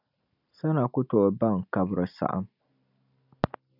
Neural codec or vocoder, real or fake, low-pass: none; real; 5.4 kHz